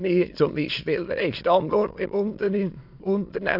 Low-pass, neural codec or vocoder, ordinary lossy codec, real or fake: 5.4 kHz; autoencoder, 22.05 kHz, a latent of 192 numbers a frame, VITS, trained on many speakers; AAC, 48 kbps; fake